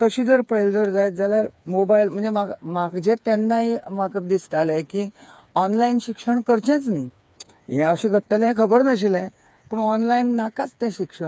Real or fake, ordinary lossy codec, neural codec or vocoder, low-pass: fake; none; codec, 16 kHz, 4 kbps, FreqCodec, smaller model; none